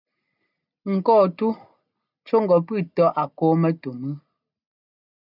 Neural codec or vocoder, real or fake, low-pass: none; real; 5.4 kHz